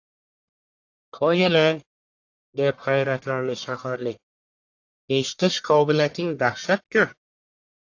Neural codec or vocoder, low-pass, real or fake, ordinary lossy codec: codec, 44.1 kHz, 1.7 kbps, Pupu-Codec; 7.2 kHz; fake; AAC, 48 kbps